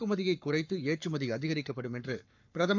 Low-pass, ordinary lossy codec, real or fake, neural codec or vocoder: 7.2 kHz; MP3, 64 kbps; fake; codec, 44.1 kHz, 7.8 kbps, Pupu-Codec